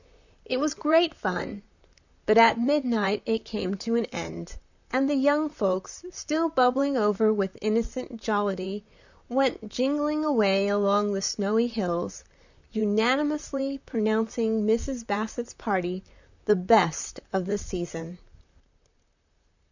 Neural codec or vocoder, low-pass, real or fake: vocoder, 44.1 kHz, 128 mel bands, Pupu-Vocoder; 7.2 kHz; fake